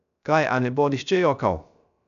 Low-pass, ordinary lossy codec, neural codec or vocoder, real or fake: 7.2 kHz; none; codec, 16 kHz, 0.3 kbps, FocalCodec; fake